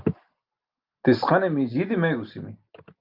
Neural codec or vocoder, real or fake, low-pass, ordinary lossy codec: none; real; 5.4 kHz; Opus, 24 kbps